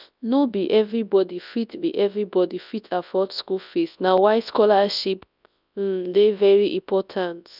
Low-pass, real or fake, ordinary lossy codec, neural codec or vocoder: 5.4 kHz; fake; none; codec, 24 kHz, 0.9 kbps, WavTokenizer, large speech release